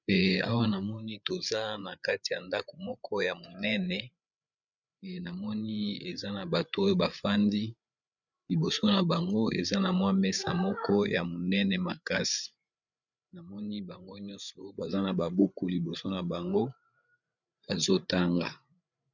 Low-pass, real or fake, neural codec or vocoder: 7.2 kHz; fake; codec, 16 kHz, 8 kbps, FreqCodec, larger model